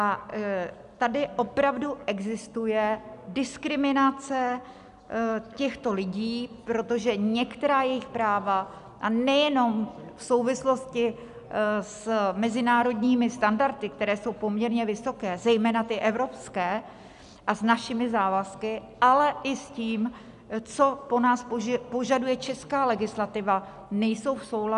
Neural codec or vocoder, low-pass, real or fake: none; 10.8 kHz; real